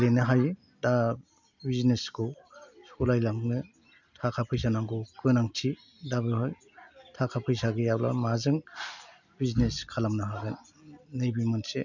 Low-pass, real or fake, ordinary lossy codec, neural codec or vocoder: 7.2 kHz; real; none; none